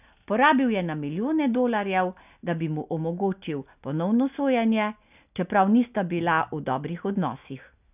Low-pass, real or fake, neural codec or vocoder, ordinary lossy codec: 3.6 kHz; real; none; none